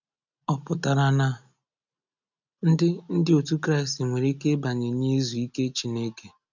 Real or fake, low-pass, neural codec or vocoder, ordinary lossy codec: real; 7.2 kHz; none; none